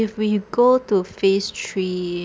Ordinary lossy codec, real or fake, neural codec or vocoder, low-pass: none; real; none; none